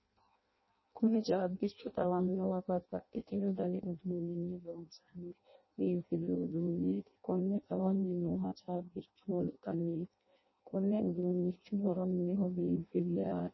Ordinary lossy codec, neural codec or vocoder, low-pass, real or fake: MP3, 24 kbps; codec, 16 kHz in and 24 kHz out, 0.6 kbps, FireRedTTS-2 codec; 7.2 kHz; fake